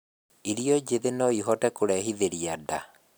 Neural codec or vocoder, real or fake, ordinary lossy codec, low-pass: none; real; none; none